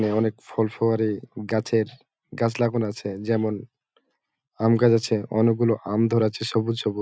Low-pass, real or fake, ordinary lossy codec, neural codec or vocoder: none; real; none; none